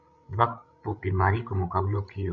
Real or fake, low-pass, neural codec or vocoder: fake; 7.2 kHz; codec, 16 kHz, 16 kbps, FreqCodec, larger model